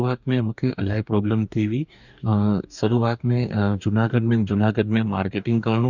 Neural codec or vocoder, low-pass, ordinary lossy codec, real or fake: codec, 44.1 kHz, 2.6 kbps, DAC; 7.2 kHz; none; fake